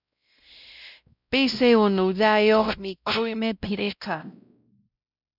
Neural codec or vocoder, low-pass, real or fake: codec, 16 kHz, 0.5 kbps, X-Codec, WavLM features, trained on Multilingual LibriSpeech; 5.4 kHz; fake